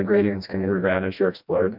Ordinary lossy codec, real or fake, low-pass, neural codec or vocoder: MP3, 48 kbps; fake; 5.4 kHz; codec, 16 kHz, 1 kbps, FreqCodec, smaller model